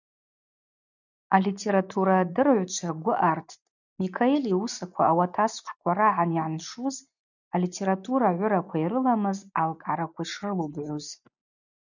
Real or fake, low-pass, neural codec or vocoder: real; 7.2 kHz; none